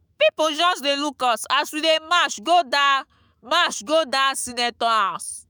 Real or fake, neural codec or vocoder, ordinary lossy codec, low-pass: fake; autoencoder, 48 kHz, 128 numbers a frame, DAC-VAE, trained on Japanese speech; none; none